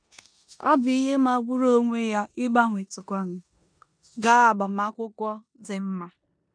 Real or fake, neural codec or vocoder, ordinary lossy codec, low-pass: fake; codec, 16 kHz in and 24 kHz out, 0.9 kbps, LongCat-Audio-Codec, four codebook decoder; none; 9.9 kHz